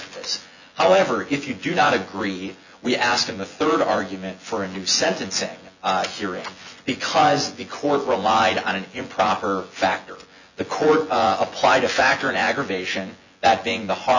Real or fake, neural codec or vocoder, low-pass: fake; vocoder, 24 kHz, 100 mel bands, Vocos; 7.2 kHz